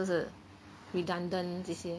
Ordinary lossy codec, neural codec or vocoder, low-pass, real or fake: none; none; none; real